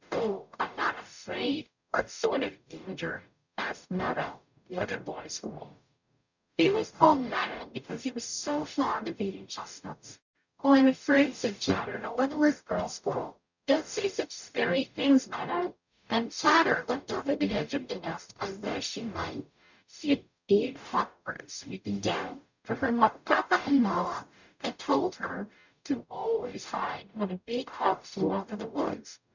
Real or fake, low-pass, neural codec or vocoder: fake; 7.2 kHz; codec, 44.1 kHz, 0.9 kbps, DAC